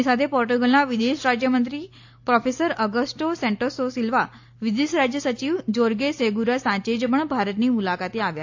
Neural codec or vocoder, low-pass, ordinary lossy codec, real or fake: none; 7.2 kHz; AAC, 48 kbps; real